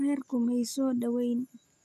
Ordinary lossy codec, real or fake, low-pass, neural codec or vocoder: none; real; 14.4 kHz; none